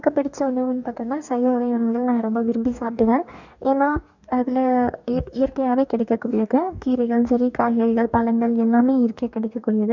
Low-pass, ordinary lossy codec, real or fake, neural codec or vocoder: 7.2 kHz; none; fake; codec, 44.1 kHz, 2.6 kbps, DAC